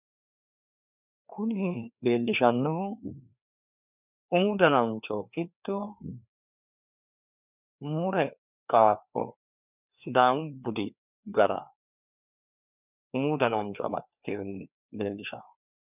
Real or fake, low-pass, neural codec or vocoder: fake; 3.6 kHz; codec, 16 kHz, 2 kbps, FreqCodec, larger model